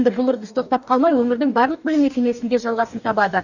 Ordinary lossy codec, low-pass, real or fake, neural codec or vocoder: none; 7.2 kHz; fake; codec, 44.1 kHz, 2.6 kbps, DAC